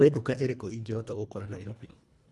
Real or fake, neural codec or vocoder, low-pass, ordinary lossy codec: fake; codec, 24 kHz, 1.5 kbps, HILCodec; none; none